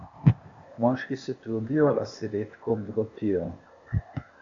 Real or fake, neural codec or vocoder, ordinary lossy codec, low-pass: fake; codec, 16 kHz, 0.8 kbps, ZipCodec; MP3, 64 kbps; 7.2 kHz